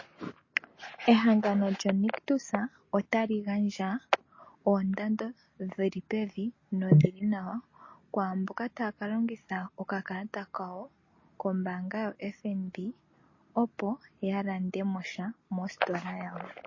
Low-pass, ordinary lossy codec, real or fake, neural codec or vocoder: 7.2 kHz; MP3, 32 kbps; real; none